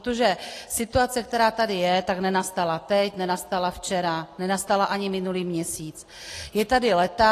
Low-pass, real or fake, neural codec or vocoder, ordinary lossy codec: 14.4 kHz; real; none; AAC, 48 kbps